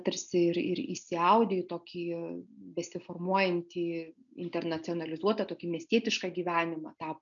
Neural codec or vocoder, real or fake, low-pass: none; real; 7.2 kHz